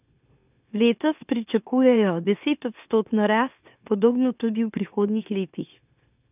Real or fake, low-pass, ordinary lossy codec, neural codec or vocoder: fake; 3.6 kHz; none; autoencoder, 44.1 kHz, a latent of 192 numbers a frame, MeloTTS